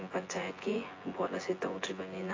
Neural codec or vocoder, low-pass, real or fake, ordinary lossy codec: vocoder, 24 kHz, 100 mel bands, Vocos; 7.2 kHz; fake; AAC, 32 kbps